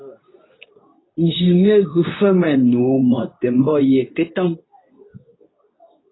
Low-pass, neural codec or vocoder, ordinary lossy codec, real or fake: 7.2 kHz; codec, 24 kHz, 0.9 kbps, WavTokenizer, medium speech release version 2; AAC, 16 kbps; fake